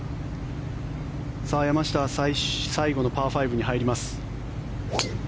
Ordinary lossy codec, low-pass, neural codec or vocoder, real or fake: none; none; none; real